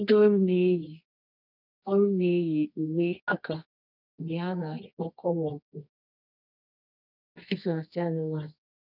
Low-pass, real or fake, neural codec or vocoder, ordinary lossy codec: 5.4 kHz; fake; codec, 24 kHz, 0.9 kbps, WavTokenizer, medium music audio release; none